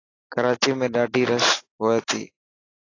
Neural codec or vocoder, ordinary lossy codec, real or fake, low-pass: none; AAC, 32 kbps; real; 7.2 kHz